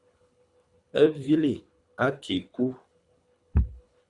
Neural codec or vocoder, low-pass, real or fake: codec, 24 kHz, 3 kbps, HILCodec; 10.8 kHz; fake